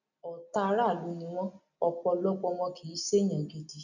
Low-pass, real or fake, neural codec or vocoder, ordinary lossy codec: 7.2 kHz; real; none; none